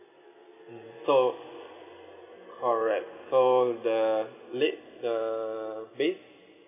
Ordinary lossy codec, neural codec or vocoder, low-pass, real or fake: none; none; 3.6 kHz; real